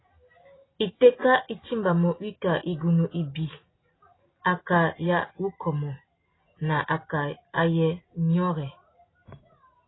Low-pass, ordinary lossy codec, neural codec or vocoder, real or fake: 7.2 kHz; AAC, 16 kbps; none; real